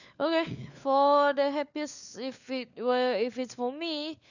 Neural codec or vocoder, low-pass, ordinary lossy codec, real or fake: codec, 16 kHz, 4 kbps, FunCodec, trained on LibriTTS, 50 frames a second; 7.2 kHz; none; fake